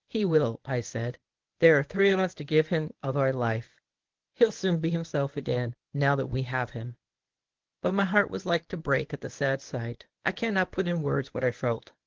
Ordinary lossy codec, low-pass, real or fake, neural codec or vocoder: Opus, 16 kbps; 7.2 kHz; fake; codec, 24 kHz, 0.9 kbps, WavTokenizer, medium speech release version 1